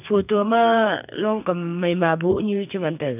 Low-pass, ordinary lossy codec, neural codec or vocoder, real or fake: 3.6 kHz; none; codec, 44.1 kHz, 2.6 kbps, SNAC; fake